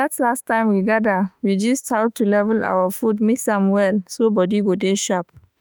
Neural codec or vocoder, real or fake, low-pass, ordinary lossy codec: autoencoder, 48 kHz, 32 numbers a frame, DAC-VAE, trained on Japanese speech; fake; none; none